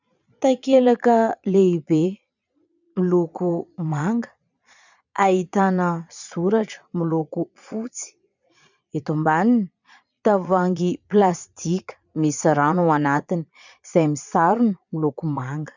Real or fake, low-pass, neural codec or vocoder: fake; 7.2 kHz; vocoder, 22.05 kHz, 80 mel bands, Vocos